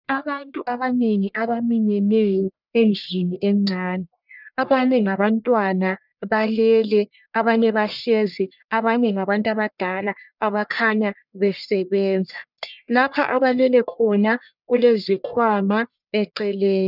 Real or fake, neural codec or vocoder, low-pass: fake; codec, 44.1 kHz, 1.7 kbps, Pupu-Codec; 5.4 kHz